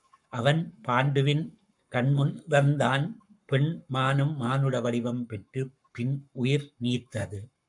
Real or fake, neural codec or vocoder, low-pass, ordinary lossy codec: fake; codec, 44.1 kHz, 7.8 kbps, Pupu-Codec; 10.8 kHz; MP3, 96 kbps